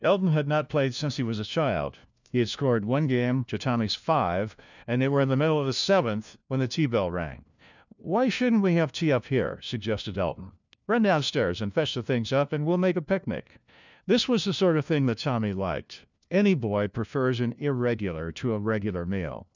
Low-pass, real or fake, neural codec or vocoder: 7.2 kHz; fake; codec, 16 kHz, 1 kbps, FunCodec, trained on LibriTTS, 50 frames a second